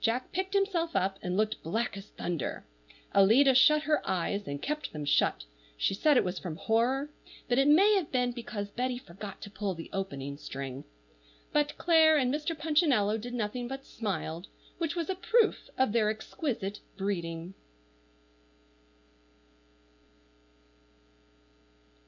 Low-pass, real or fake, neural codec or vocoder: 7.2 kHz; real; none